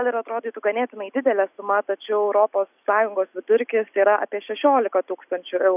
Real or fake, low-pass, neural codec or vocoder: real; 3.6 kHz; none